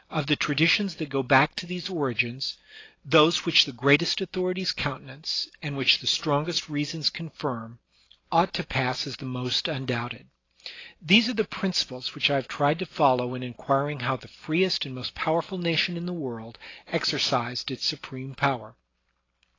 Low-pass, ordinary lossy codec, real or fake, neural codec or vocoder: 7.2 kHz; AAC, 32 kbps; real; none